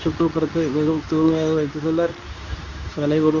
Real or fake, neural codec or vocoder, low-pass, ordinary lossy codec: fake; codec, 24 kHz, 0.9 kbps, WavTokenizer, medium speech release version 2; 7.2 kHz; none